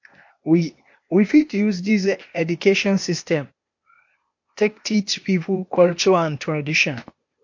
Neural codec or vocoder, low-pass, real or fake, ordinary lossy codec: codec, 16 kHz, 0.8 kbps, ZipCodec; 7.2 kHz; fake; MP3, 48 kbps